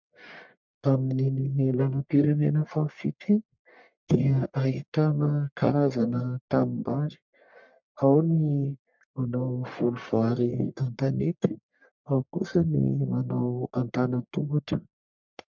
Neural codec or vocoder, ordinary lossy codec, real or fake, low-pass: codec, 44.1 kHz, 1.7 kbps, Pupu-Codec; MP3, 64 kbps; fake; 7.2 kHz